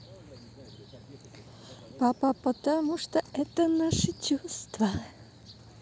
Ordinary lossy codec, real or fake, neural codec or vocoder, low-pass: none; real; none; none